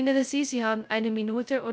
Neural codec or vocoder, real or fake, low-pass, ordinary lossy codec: codec, 16 kHz, 0.2 kbps, FocalCodec; fake; none; none